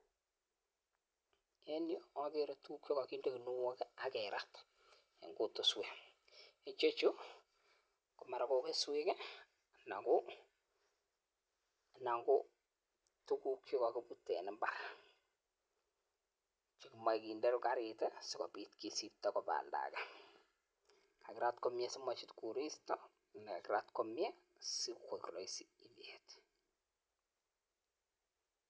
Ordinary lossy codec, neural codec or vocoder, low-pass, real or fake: none; none; none; real